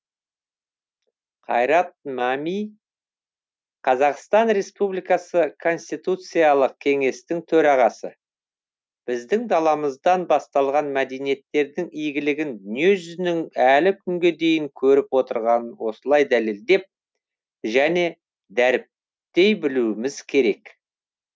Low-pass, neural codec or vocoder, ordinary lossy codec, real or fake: none; none; none; real